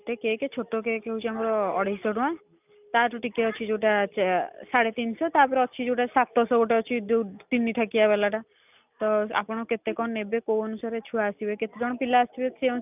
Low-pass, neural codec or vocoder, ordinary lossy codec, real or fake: 3.6 kHz; none; none; real